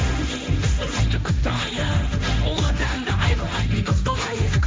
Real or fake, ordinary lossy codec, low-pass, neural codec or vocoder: fake; none; none; codec, 16 kHz, 1.1 kbps, Voila-Tokenizer